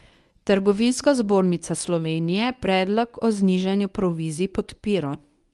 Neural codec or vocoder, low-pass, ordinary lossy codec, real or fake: codec, 24 kHz, 0.9 kbps, WavTokenizer, medium speech release version 1; 10.8 kHz; Opus, 32 kbps; fake